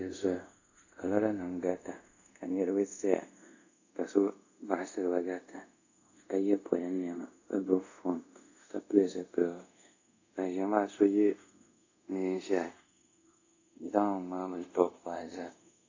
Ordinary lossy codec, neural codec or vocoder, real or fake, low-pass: AAC, 48 kbps; codec, 24 kHz, 0.5 kbps, DualCodec; fake; 7.2 kHz